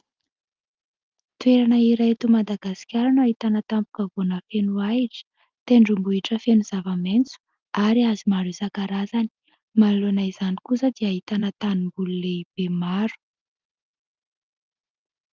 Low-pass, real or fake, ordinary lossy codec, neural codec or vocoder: 7.2 kHz; real; Opus, 24 kbps; none